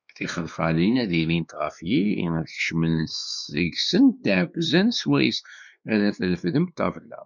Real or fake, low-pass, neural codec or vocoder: fake; 7.2 kHz; codec, 16 kHz, 2 kbps, X-Codec, WavLM features, trained on Multilingual LibriSpeech